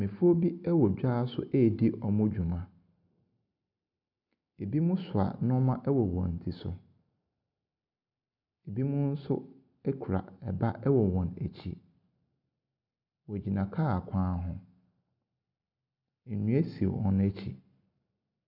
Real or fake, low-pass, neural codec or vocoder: real; 5.4 kHz; none